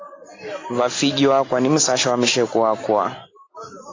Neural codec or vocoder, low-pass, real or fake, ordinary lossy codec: none; 7.2 kHz; real; AAC, 32 kbps